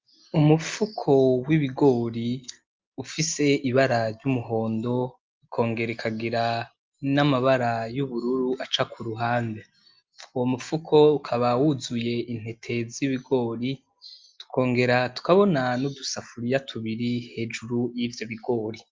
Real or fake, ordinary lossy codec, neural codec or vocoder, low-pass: real; Opus, 32 kbps; none; 7.2 kHz